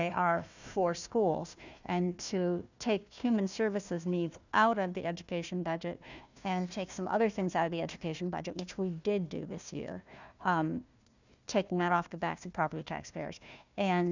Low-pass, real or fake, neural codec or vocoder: 7.2 kHz; fake; codec, 16 kHz, 1 kbps, FunCodec, trained on Chinese and English, 50 frames a second